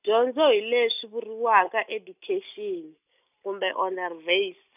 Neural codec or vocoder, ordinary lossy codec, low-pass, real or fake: none; none; 3.6 kHz; real